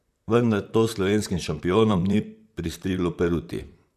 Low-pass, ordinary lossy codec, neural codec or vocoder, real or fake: 14.4 kHz; none; vocoder, 44.1 kHz, 128 mel bands, Pupu-Vocoder; fake